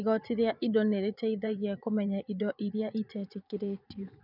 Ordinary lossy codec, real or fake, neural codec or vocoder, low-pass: none; fake; vocoder, 24 kHz, 100 mel bands, Vocos; 5.4 kHz